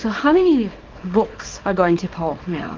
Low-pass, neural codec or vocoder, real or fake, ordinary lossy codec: 7.2 kHz; codec, 24 kHz, 0.9 kbps, WavTokenizer, small release; fake; Opus, 24 kbps